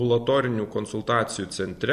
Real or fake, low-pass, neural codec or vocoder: fake; 14.4 kHz; vocoder, 44.1 kHz, 128 mel bands every 256 samples, BigVGAN v2